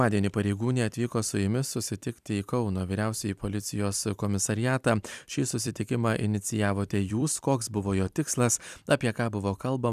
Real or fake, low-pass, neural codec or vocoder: fake; 14.4 kHz; vocoder, 44.1 kHz, 128 mel bands every 256 samples, BigVGAN v2